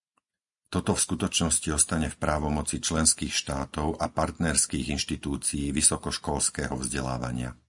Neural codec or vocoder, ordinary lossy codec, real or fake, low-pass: none; MP3, 96 kbps; real; 10.8 kHz